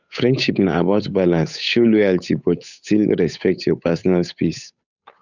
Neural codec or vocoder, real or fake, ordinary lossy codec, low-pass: codec, 16 kHz, 8 kbps, FunCodec, trained on Chinese and English, 25 frames a second; fake; none; 7.2 kHz